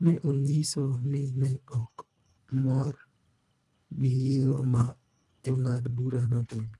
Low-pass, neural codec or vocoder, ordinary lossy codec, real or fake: none; codec, 24 kHz, 1.5 kbps, HILCodec; none; fake